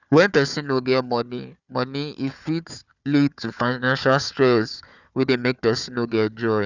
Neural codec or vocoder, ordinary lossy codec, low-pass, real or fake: codec, 16 kHz, 4 kbps, FunCodec, trained on Chinese and English, 50 frames a second; none; 7.2 kHz; fake